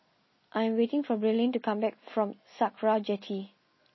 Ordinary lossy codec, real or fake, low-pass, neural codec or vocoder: MP3, 24 kbps; real; 7.2 kHz; none